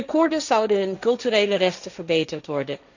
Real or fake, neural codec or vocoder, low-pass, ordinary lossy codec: fake; codec, 16 kHz, 1.1 kbps, Voila-Tokenizer; 7.2 kHz; none